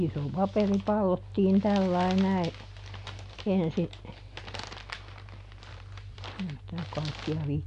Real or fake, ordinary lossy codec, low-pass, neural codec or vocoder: real; none; 10.8 kHz; none